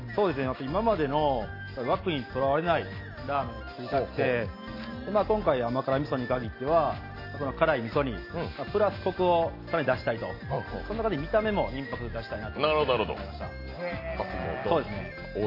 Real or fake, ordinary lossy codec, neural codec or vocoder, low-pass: real; AAC, 32 kbps; none; 5.4 kHz